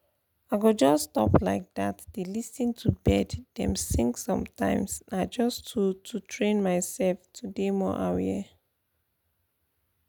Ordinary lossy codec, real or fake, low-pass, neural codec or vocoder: none; real; none; none